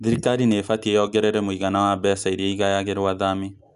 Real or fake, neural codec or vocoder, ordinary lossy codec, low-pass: real; none; none; 10.8 kHz